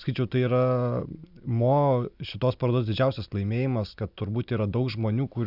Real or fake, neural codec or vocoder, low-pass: real; none; 5.4 kHz